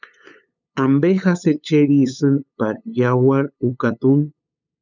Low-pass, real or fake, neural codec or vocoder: 7.2 kHz; fake; codec, 16 kHz, 8 kbps, FunCodec, trained on LibriTTS, 25 frames a second